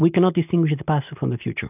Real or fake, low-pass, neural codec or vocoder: real; 3.6 kHz; none